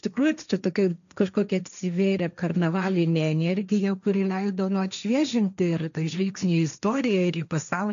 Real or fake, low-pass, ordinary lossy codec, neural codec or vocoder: fake; 7.2 kHz; MP3, 96 kbps; codec, 16 kHz, 1.1 kbps, Voila-Tokenizer